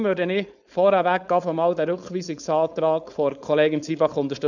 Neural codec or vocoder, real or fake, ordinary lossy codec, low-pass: codec, 16 kHz, 4.8 kbps, FACodec; fake; none; 7.2 kHz